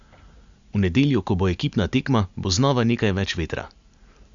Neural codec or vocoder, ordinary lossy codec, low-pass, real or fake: none; Opus, 64 kbps; 7.2 kHz; real